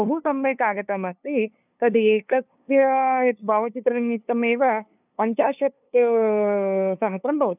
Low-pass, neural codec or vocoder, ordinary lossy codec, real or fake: 3.6 kHz; codec, 16 kHz, 2 kbps, FunCodec, trained on LibriTTS, 25 frames a second; none; fake